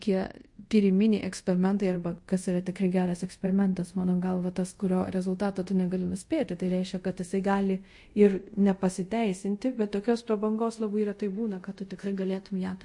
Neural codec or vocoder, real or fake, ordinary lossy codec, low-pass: codec, 24 kHz, 0.5 kbps, DualCodec; fake; MP3, 48 kbps; 10.8 kHz